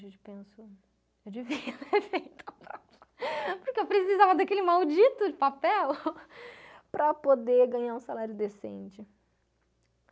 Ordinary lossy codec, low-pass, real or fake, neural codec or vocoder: none; none; real; none